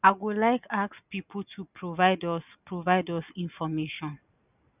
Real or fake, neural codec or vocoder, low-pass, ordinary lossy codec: real; none; 3.6 kHz; none